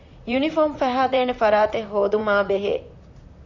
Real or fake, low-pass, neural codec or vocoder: fake; 7.2 kHz; vocoder, 44.1 kHz, 128 mel bands, Pupu-Vocoder